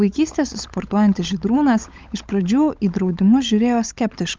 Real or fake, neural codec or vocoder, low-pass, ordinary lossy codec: fake; codec, 16 kHz, 16 kbps, FunCodec, trained on LibriTTS, 50 frames a second; 7.2 kHz; Opus, 24 kbps